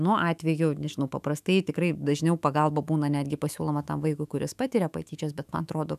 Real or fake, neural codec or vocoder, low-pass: fake; autoencoder, 48 kHz, 128 numbers a frame, DAC-VAE, trained on Japanese speech; 14.4 kHz